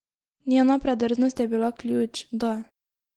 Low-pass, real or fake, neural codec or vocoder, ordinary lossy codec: 19.8 kHz; real; none; Opus, 16 kbps